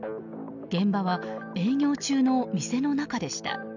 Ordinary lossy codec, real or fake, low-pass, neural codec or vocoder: none; real; 7.2 kHz; none